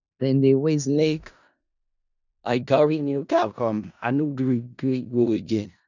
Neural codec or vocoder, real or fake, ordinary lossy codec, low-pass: codec, 16 kHz in and 24 kHz out, 0.4 kbps, LongCat-Audio-Codec, four codebook decoder; fake; none; 7.2 kHz